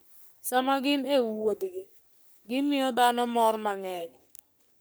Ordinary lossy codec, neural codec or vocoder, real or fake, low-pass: none; codec, 44.1 kHz, 3.4 kbps, Pupu-Codec; fake; none